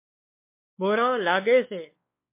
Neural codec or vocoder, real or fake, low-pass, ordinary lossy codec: codec, 16 kHz, 2 kbps, X-Codec, WavLM features, trained on Multilingual LibriSpeech; fake; 3.6 kHz; MP3, 24 kbps